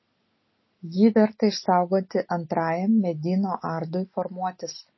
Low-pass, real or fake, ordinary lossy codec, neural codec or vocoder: 7.2 kHz; real; MP3, 24 kbps; none